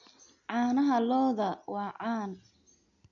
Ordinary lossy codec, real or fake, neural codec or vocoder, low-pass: none; real; none; 7.2 kHz